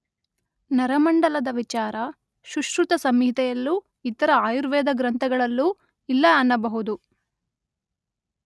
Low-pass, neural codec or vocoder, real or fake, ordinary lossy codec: none; none; real; none